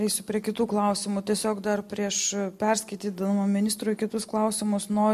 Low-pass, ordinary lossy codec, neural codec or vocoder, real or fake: 14.4 kHz; MP3, 64 kbps; none; real